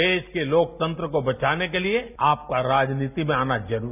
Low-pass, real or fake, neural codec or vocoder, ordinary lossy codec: 3.6 kHz; real; none; none